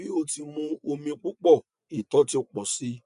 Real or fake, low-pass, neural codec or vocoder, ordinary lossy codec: fake; 10.8 kHz; vocoder, 24 kHz, 100 mel bands, Vocos; none